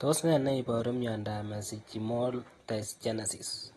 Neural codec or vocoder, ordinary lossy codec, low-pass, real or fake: none; AAC, 32 kbps; 19.8 kHz; real